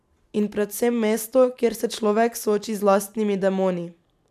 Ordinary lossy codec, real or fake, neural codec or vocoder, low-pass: none; real; none; 14.4 kHz